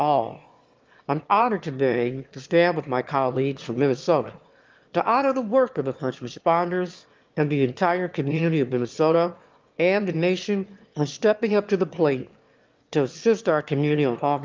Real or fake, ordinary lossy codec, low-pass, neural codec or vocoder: fake; Opus, 32 kbps; 7.2 kHz; autoencoder, 22.05 kHz, a latent of 192 numbers a frame, VITS, trained on one speaker